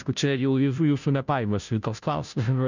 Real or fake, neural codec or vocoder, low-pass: fake; codec, 16 kHz, 0.5 kbps, FunCodec, trained on Chinese and English, 25 frames a second; 7.2 kHz